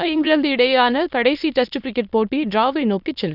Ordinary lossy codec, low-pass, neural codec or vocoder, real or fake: none; 5.4 kHz; autoencoder, 22.05 kHz, a latent of 192 numbers a frame, VITS, trained on many speakers; fake